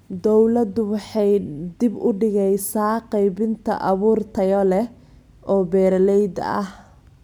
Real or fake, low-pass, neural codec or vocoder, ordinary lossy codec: real; 19.8 kHz; none; none